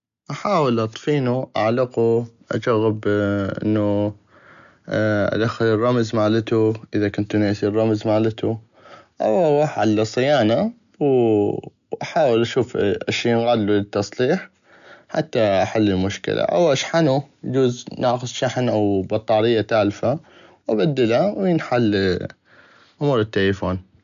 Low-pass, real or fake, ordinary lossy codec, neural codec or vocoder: 7.2 kHz; real; none; none